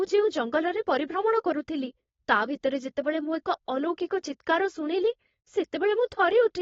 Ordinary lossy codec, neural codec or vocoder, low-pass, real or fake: AAC, 24 kbps; codec, 16 kHz, 4.8 kbps, FACodec; 7.2 kHz; fake